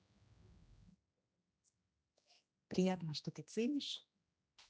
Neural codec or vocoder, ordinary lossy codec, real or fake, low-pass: codec, 16 kHz, 1 kbps, X-Codec, HuBERT features, trained on general audio; none; fake; none